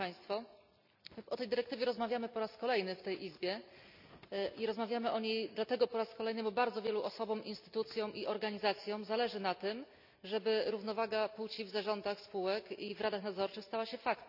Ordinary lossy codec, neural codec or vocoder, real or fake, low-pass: none; none; real; 5.4 kHz